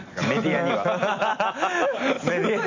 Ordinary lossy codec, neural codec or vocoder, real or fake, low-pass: AAC, 48 kbps; none; real; 7.2 kHz